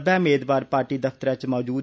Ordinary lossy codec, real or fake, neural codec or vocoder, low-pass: none; real; none; none